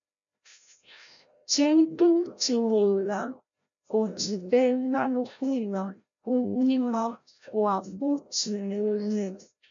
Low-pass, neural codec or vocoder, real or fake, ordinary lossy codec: 7.2 kHz; codec, 16 kHz, 0.5 kbps, FreqCodec, larger model; fake; MP3, 64 kbps